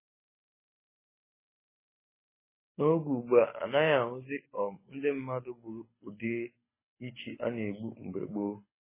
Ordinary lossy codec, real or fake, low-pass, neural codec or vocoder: MP3, 16 kbps; fake; 3.6 kHz; codec, 24 kHz, 6 kbps, HILCodec